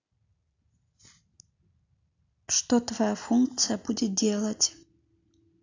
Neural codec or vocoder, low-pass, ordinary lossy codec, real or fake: none; 7.2 kHz; AAC, 48 kbps; real